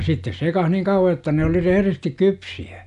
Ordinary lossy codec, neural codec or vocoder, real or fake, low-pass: none; none; real; 10.8 kHz